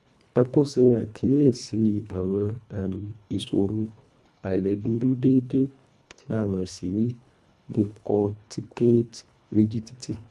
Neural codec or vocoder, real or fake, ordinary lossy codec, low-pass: codec, 24 kHz, 1.5 kbps, HILCodec; fake; none; none